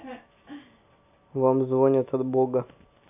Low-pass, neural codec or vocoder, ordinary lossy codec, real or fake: 3.6 kHz; none; AAC, 32 kbps; real